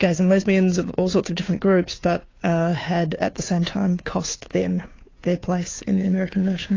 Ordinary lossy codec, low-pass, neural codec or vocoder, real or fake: AAC, 32 kbps; 7.2 kHz; codec, 16 kHz, 2 kbps, FunCodec, trained on Chinese and English, 25 frames a second; fake